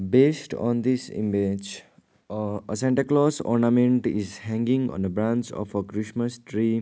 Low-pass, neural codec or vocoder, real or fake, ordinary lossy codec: none; none; real; none